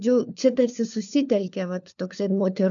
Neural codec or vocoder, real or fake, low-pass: codec, 16 kHz, 4 kbps, FunCodec, trained on LibriTTS, 50 frames a second; fake; 7.2 kHz